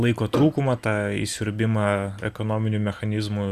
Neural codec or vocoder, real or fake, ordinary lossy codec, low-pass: none; real; Opus, 64 kbps; 14.4 kHz